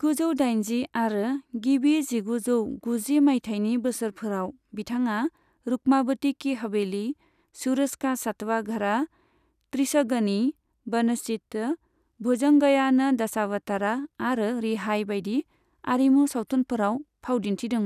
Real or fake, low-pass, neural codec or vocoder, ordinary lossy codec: real; 14.4 kHz; none; none